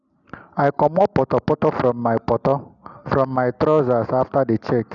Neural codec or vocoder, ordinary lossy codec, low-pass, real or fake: none; none; 7.2 kHz; real